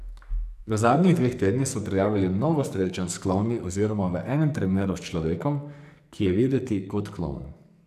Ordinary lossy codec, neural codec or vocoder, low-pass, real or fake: none; codec, 44.1 kHz, 2.6 kbps, SNAC; 14.4 kHz; fake